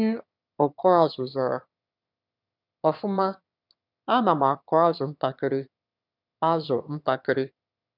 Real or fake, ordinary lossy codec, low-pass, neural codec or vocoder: fake; none; 5.4 kHz; autoencoder, 22.05 kHz, a latent of 192 numbers a frame, VITS, trained on one speaker